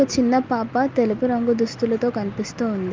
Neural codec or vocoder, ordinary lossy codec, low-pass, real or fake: none; Opus, 24 kbps; 7.2 kHz; real